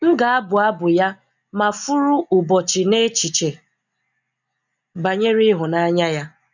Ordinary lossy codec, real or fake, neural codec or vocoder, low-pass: none; real; none; 7.2 kHz